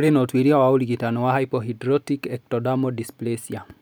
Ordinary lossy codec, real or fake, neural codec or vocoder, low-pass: none; real; none; none